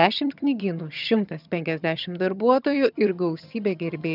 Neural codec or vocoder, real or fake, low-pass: vocoder, 22.05 kHz, 80 mel bands, HiFi-GAN; fake; 5.4 kHz